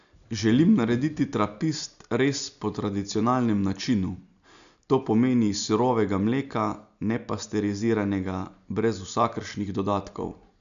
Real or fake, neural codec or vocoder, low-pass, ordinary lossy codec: real; none; 7.2 kHz; none